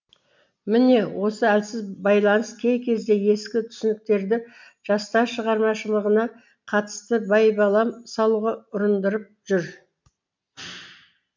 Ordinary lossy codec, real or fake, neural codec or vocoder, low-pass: MP3, 64 kbps; real; none; 7.2 kHz